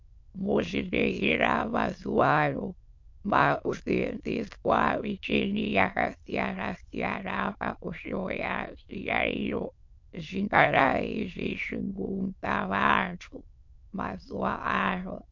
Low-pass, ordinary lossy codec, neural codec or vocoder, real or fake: 7.2 kHz; MP3, 48 kbps; autoencoder, 22.05 kHz, a latent of 192 numbers a frame, VITS, trained on many speakers; fake